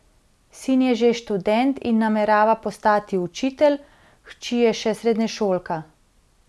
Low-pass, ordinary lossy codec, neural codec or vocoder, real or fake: none; none; none; real